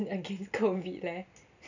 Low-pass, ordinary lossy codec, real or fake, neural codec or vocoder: 7.2 kHz; none; real; none